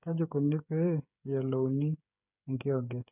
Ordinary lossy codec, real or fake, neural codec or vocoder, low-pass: none; fake; codec, 16 kHz, 8 kbps, FreqCodec, smaller model; 3.6 kHz